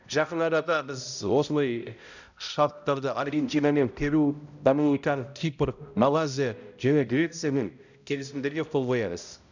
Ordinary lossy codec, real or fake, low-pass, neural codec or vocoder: none; fake; 7.2 kHz; codec, 16 kHz, 0.5 kbps, X-Codec, HuBERT features, trained on balanced general audio